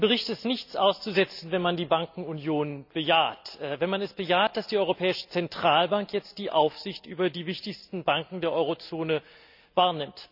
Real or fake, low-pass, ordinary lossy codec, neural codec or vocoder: real; 5.4 kHz; none; none